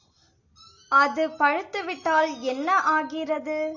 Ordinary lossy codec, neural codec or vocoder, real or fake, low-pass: AAC, 48 kbps; none; real; 7.2 kHz